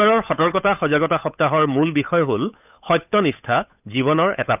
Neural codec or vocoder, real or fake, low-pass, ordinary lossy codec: codec, 16 kHz, 8 kbps, FunCodec, trained on Chinese and English, 25 frames a second; fake; 3.6 kHz; none